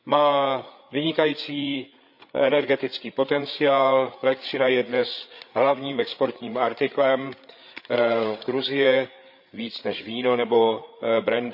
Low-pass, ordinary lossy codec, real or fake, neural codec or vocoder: 5.4 kHz; none; fake; codec, 16 kHz, 8 kbps, FreqCodec, larger model